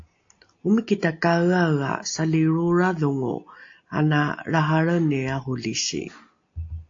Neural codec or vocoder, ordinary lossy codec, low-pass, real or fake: none; AAC, 48 kbps; 7.2 kHz; real